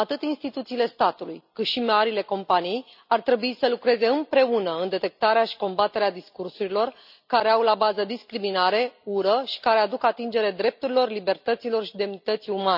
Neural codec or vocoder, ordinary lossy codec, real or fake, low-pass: none; none; real; 5.4 kHz